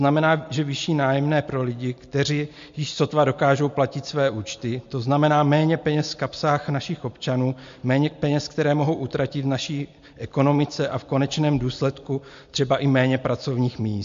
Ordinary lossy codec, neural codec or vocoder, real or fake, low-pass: MP3, 48 kbps; none; real; 7.2 kHz